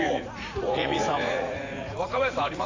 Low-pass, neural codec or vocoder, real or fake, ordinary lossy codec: 7.2 kHz; vocoder, 44.1 kHz, 80 mel bands, Vocos; fake; AAC, 32 kbps